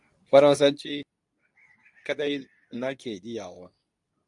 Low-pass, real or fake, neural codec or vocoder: 10.8 kHz; fake; codec, 24 kHz, 0.9 kbps, WavTokenizer, medium speech release version 2